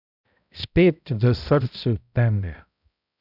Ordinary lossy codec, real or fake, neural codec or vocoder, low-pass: none; fake; codec, 16 kHz, 0.5 kbps, X-Codec, HuBERT features, trained on balanced general audio; 5.4 kHz